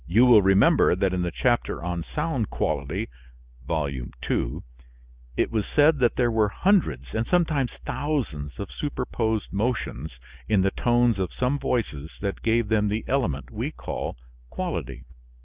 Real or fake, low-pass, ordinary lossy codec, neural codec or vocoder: real; 3.6 kHz; Opus, 64 kbps; none